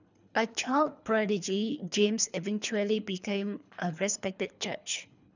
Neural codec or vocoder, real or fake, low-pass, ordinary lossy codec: codec, 24 kHz, 3 kbps, HILCodec; fake; 7.2 kHz; none